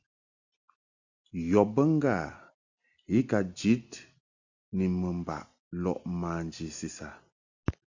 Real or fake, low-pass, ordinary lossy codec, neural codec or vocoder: real; 7.2 kHz; Opus, 64 kbps; none